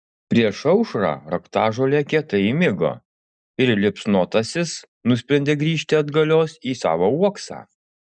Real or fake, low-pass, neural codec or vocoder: real; 9.9 kHz; none